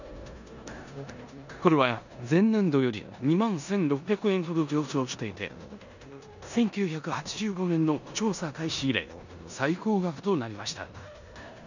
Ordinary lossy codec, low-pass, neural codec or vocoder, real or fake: none; 7.2 kHz; codec, 16 kHz in and 24 kHz out, 0.9 kbps, LongCat-Audio-Codec, four codebook decoder; fake